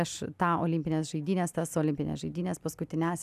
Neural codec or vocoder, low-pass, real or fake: none; 14.4 kHz; real